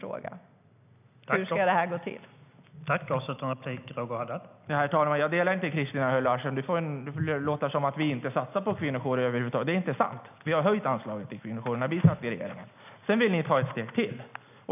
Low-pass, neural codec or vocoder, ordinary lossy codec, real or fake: 3.6 kHz; none; none; real